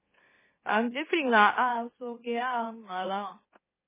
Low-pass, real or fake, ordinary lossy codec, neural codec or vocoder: 3.6 kHz; fake; MP3, 16 kbps; autoencoder, 44.1 kHz, a latent of 192 numbers a frame, MeloTTS